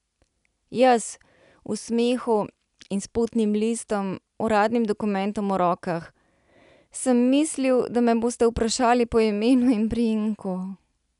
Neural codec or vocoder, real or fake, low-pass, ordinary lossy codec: none; real; 10.8 kHz; none